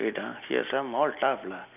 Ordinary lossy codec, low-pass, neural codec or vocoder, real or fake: none; 3.6 kHz; vocoder, 44.1 kHz, 128 mel bands every 256 samples, BigVGAN v2; fake